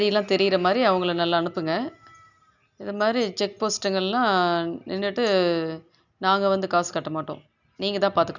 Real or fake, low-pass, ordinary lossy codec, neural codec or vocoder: real; 7.2 kHz; none; none